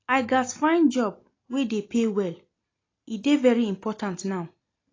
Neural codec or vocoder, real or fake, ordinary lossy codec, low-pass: none; real; AAC, 32 kbps; 7.2 kHz